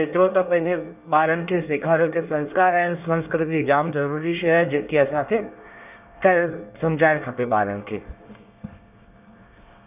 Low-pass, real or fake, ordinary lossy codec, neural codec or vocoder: 3.6 kHz; fake; none; codec, 24 kHz, 1 kbps, SNAC